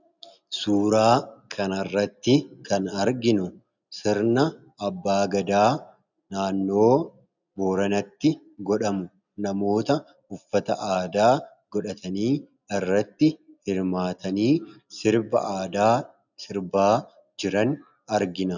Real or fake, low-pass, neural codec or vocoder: real; 7.2 kHz; none